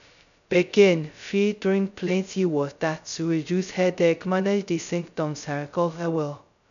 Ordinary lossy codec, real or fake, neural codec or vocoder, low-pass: none; fake; codec, 16 kHz, 0.2 kbps, FocalCodec; 7.2 kHz